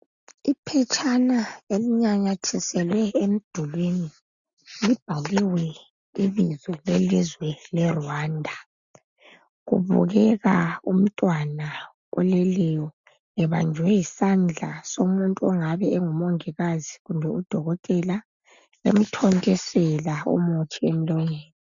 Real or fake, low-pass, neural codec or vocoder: real; 7.2 kHz; none